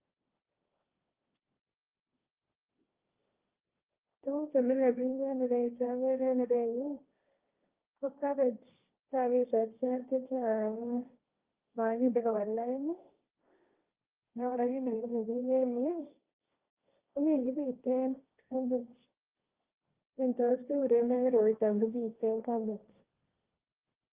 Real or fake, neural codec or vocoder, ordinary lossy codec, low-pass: fake; codec, 16 kHz, 1.1 kbps, Voila-Tokenizer; Opus, 24 kbps; 3.6 kHz